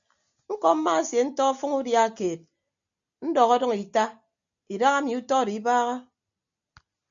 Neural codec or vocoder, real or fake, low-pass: none; real; 7.2 kHz